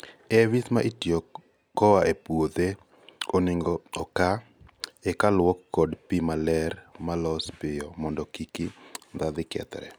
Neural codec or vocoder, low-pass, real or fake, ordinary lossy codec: none; none; real; none